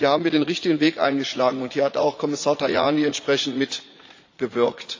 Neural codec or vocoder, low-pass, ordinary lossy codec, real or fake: vocoder, 44.1 kHz, 80 mel bands, Vocos; 7.2 kHz; AAC, 48 kbps; fake